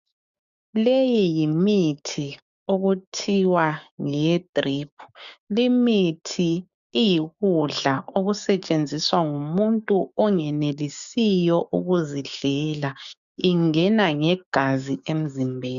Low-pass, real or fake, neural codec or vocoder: 7.2 kHz; fake; codec, 16 kHz, 6 kbps, DAC